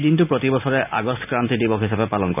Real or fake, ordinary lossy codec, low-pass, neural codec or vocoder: real; none; 3.6 kHz; none